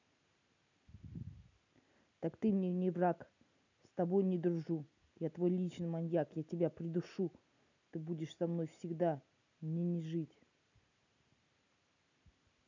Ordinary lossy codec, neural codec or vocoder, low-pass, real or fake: none; none; 7.2 kHz; real